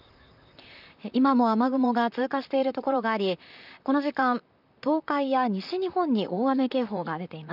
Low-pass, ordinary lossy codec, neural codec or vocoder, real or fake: 5.4 kHz; none; vocoder, 44.1 kHz, 128 mel bands, Pupu-Vocoder; fake